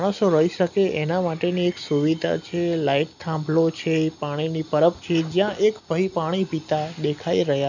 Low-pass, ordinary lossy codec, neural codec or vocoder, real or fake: 7.2 kHz; none; none; real